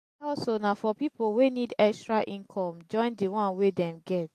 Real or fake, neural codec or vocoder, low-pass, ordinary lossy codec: real; none; 14.4 kHz; AAC, 64 kbps